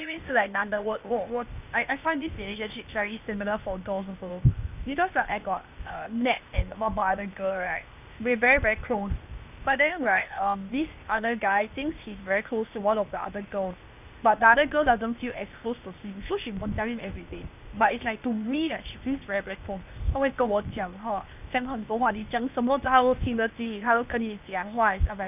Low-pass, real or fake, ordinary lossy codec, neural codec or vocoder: 3.6 kHz; fake; none; codec, 16 kHz, 0.8 kbps, ZipCodec